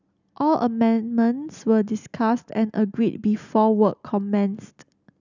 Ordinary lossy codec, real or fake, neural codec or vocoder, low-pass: none; real; none; 7.2 kHz